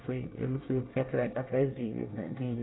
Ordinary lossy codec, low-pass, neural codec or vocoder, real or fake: AAC, 16 kbps; 7.2 kHz; codec, 24 kHz, 1 kbps, SNAC; fake